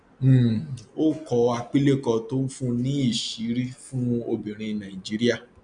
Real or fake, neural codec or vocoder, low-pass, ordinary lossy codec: real; none; 9.9 kHz; none